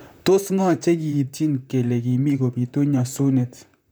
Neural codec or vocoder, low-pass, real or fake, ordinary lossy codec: vocoder, 44.1 kHz, 128 mel bands, Pupu-Vocoder; none; fake; none